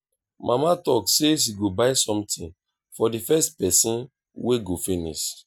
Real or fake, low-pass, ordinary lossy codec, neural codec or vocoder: real; none; none; none